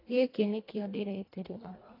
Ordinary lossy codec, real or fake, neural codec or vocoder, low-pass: AAC, 24 kbps; fake; codec, 24 kHz, 1.5 kbps, HILCodec; 5.4 kHz